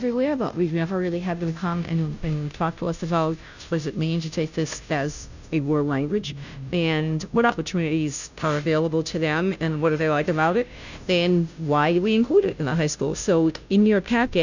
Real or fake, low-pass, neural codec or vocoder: fake; 7.2 kHz; codec, 16 kHz, 0.5 kbps, FunCodec, trained on Chinese and English, 25 frames a second